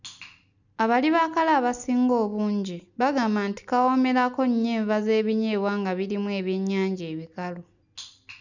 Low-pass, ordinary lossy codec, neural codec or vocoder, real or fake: 7.2 kHz; none; none; real